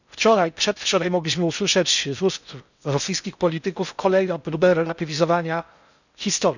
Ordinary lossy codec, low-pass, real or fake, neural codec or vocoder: none; 7.2 kHz; fake; codec, 16 kHz in and 24 kHz out, 0.6 kbps, FocalCodec, streaming, 4096 codes